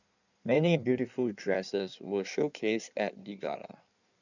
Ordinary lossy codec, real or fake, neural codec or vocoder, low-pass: none; fake; codec, 16 kHz in and 24 kHz out, 1.1 kbps, FireRedTTS-2 codec; 7.2 kHz